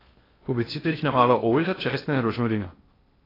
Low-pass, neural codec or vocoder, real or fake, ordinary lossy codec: 5.4 kHz; codec, 16 kHz in and 24 kHz out, 0.6 kbps, FocalCodec, streaming, 2048 codes; fake; AAC, 24 kbps